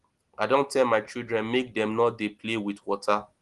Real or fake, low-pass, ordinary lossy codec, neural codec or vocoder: real; 10.8 kHz; Opus, 24 kbps; none